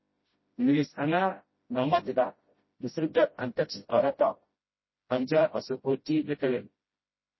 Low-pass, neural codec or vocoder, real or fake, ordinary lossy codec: 7.2 kHz; codec, 16 kHz, 0.5 kbps, FreqCodec, smaller model; fake; MP3, 24 kbps